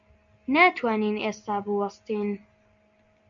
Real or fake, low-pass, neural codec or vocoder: real; 7.2 kHz; none